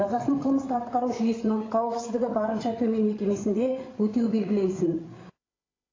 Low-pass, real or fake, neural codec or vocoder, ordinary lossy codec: 7.2 kHz; fake; codec, 16 kHz, 8 kbps, FreqCodec, larger model; AAC, 32 kbps